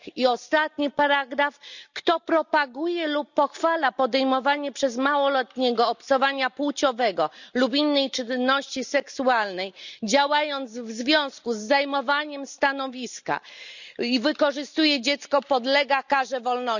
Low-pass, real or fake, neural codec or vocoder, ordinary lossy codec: 7.2 kHz; real; none; none